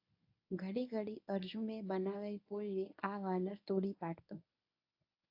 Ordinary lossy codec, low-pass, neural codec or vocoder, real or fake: AAC, 48 kbps; 5.4 kHz; codec, 24 kHz, 0.9 kbps, WavTokenizer, medium speech release version 1; fake